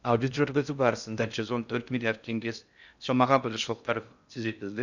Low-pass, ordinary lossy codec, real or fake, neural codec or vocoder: 7.2 kHz; none; fake; codec, 16 kHz in and 24 kHz out, 0.6 kbps, FocalCodec, streaming, 2048 codes